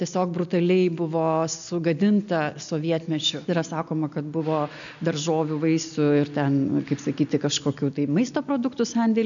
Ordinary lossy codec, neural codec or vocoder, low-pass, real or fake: MP3, 96 kbps; none; 7.2 kHz; real